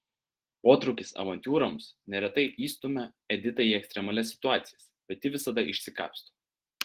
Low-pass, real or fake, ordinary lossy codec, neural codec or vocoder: 14.4 kHz; real; Opus, 16 kbps; none